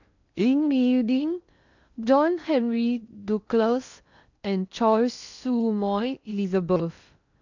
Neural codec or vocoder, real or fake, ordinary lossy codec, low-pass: codec, 16 kHz in and 24 kHz out, 0.6 kbps, FocalCodec, streaming, 2048 codes; fake; none; 7.2 kHz